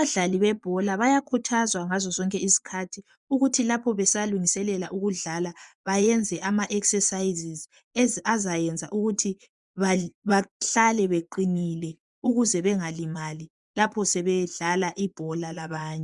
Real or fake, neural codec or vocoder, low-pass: fake; vocoder, 24 kHz, 100 mel bands, Vocos; 10.8 kHz